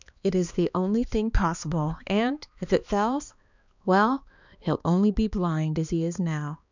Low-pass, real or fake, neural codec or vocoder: 7.2 kHz; fake; codec, 16 kHz, 2 kbps, X-Codec, HuBERT features, trained on balanced general audio